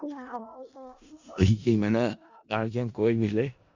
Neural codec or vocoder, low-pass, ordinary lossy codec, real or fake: codec, 16 kHz in and 24 kHz out, 0.4 kbps, LongCat-Audio-Codec, four codebook decoder; 7.2 kHz; Opus, 64 kbps; fake